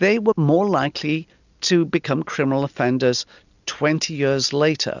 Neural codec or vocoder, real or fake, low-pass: none; real; 7.2 kHz